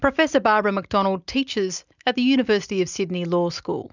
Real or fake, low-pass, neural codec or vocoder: real; 7.2 kHz; none